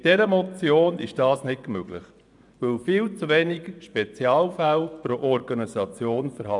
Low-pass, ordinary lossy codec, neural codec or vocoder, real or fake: 10.8 kHz; none; vocoder, 24 kHz, 100 mel bands, Vocos; fake